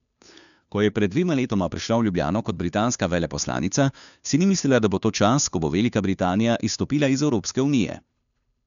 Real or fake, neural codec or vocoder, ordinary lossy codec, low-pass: fake; codec, 16 kHz, 2 kbps, FunCodec, trained on Chinese and English, 25 frames a second; none; 7.2 kHz